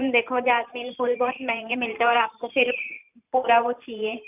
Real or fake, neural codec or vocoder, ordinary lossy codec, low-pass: fake; vocoder, 44.1 kHz, 128 mel bands every 512 samples, BigVGAN v2; none; 3.6 kHz